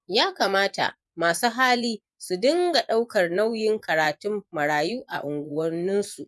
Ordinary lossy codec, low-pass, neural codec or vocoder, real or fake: none; none; vocoder, 24 kHz, 100 mel bands, Vocos; fake